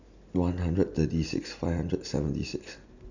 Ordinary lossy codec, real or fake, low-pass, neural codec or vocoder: none; real; 7.2 kHz; none